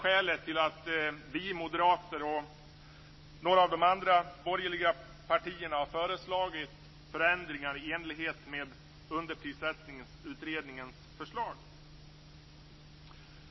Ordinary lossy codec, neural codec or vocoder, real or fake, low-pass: MP3, 24 kbps; none; real; 7.2 kHz